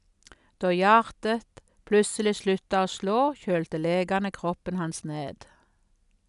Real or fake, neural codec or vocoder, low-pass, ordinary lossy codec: real; none; 10.8 kHz; none